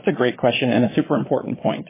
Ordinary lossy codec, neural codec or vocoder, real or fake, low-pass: MP3, 16 kbps; vocoder, 22.05 kHz, 80 mel bands, Vocos; fake; 3.6 kHz